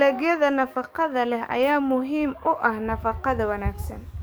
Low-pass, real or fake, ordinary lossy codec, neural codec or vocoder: none; fake; none; codec, 44.1 kHz, 7.8 kbps, DAC